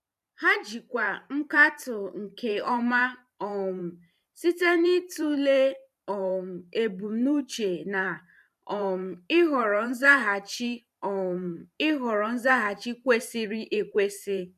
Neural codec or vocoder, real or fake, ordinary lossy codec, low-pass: vocoder, 44.1 kHz, 128 mel bands every 512 samples, BigVGAN v2; fake; none; 14.4 kHz